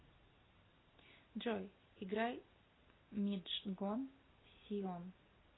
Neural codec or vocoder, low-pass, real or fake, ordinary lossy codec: none; 7.2 kHz; real; AAC, 16 kbps